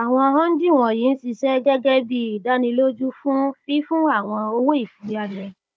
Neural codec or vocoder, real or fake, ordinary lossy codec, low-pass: codec, 16 kHz, 16 kbps, FunCodec, trained on Chinese and English, 50 frames a second; fake; none; none